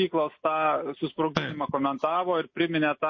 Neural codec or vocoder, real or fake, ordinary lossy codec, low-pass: none; real; MP3, 32 kbps; 7.2 kHz